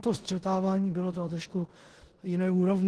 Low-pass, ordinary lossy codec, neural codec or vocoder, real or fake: 10.8 kHz; Opus, 16 kbps; codec, 16 kHz in and 24 kHz out, 0.9 kbps, LongCat-Audio-Codec, four codebook decoder; fake